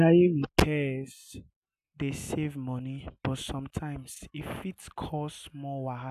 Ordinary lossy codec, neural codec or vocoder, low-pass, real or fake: AAC, 96 kbps; none; 14.4 kHz; real